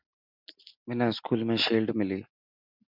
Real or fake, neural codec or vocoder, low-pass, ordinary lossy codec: real; none; 5.4 kHz; MP3, 48 kbps